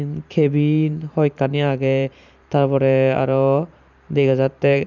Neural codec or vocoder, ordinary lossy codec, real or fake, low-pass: vocoder, 44.1 kHz, 128 mel bands every 512 samples, BigVGAN v2; none; fake; 7.2 kHz